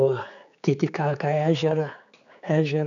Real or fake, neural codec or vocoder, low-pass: fake; codec, 16 kHz, 4 kbps, X-Codec, HuBERT features, trained on general audio; 7.2 kHz